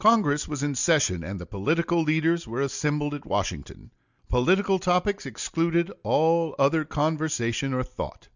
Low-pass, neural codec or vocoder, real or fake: 7.2 kHz; none; real